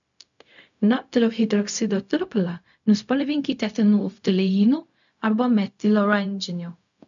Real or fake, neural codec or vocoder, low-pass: fake; codec, 16 kHz, 0.4 kbps, LongCat-Audio-Codec; 7.2 kHz